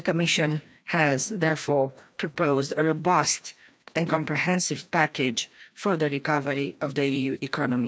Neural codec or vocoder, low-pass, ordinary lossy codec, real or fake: codec, 16 kHz, 1 kbps, FreqCodec, larger model; none; none; fake